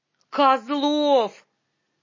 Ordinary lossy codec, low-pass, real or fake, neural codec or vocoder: MP3, 32 kbps; 7.2 kHz; real; none